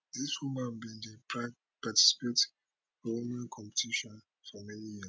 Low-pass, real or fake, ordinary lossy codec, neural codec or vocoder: none; real; none; none